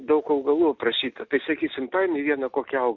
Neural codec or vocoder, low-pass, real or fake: none; 7.2 kHz; real